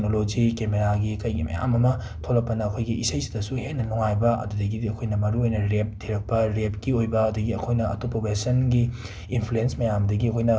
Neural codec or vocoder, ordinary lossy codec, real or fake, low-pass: none; none; real; none